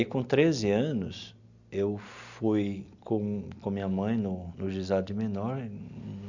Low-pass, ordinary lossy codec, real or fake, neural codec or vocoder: 7.2 kHz; none; real; none